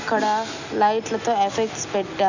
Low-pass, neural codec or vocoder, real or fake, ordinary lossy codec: 7.2 kHz; none; real; none